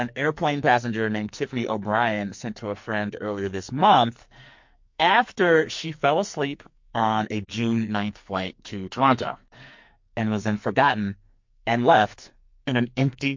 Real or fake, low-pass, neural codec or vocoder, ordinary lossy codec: fake; 7.2 kHz; codec, 44.1 kHz, 2.6 kbps, SNAC; MP3, 48 kbps